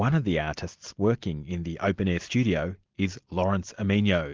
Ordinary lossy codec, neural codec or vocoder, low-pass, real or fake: Opus, 32 kbps; none; 7.2 kHz; real